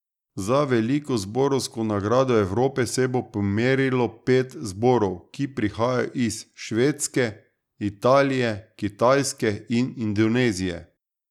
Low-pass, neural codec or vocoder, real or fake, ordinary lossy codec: 19.8 kHz; none; real; none